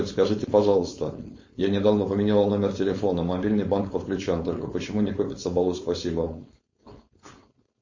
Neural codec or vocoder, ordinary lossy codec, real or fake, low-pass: codec, 16 kHz, 4.8 kbps, FACodec; MP3, 32 kbps; fake; 7.2 kHz